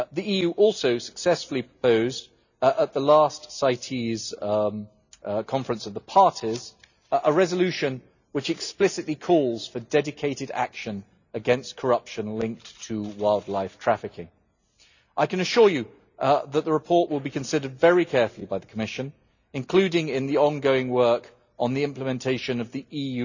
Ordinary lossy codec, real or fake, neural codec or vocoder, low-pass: none; real; none; 7.2 kHz